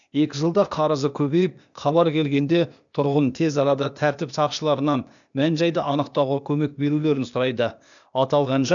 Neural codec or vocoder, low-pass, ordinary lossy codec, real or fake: codec, 16 kHz, 0.8 kbps, ZipCodec; 7.2 kHz; none; fake